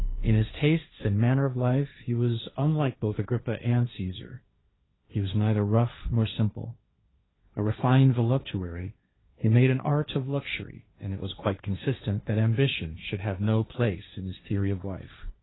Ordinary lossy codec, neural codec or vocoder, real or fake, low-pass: AAC, 16 kbps; codec, 16 kHz, 1.1 kbps, Voila-Tokenizer; fake; 7.2 kHz